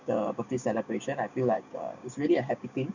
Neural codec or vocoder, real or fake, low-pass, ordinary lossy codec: codec, 16 kHz, 6 kbps, DAC; fake; 7.2 kHz; none